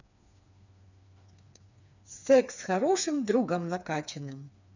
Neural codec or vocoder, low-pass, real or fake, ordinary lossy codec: codec, 16 kHz, 4 kbps, FreqCodec, smaller model; 7.2 kHz; fake; none